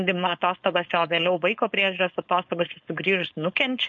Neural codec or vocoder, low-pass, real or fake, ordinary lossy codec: codec, 16 kHz, 4.8 kbps, FACodec; 7.2 kHz; fake; MP3, 48 kbps